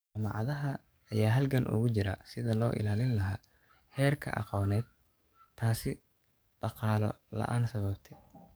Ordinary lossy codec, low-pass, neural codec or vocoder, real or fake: none; none; codec, 44.1 kHz, 7.8 kbps, DAC; fake